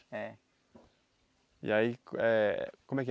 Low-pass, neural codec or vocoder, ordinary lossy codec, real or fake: none; none; none; real